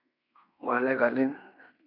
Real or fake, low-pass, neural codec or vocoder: fake; 5.4 kHz; codec, 16 kHz in and 24 kHz out, 0.9 kbps, LongCat-Audio-Codec, fine tuned four codebook decoder